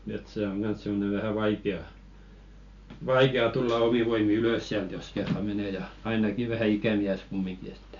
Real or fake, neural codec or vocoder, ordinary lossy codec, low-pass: real; none; none; 7.2 kHz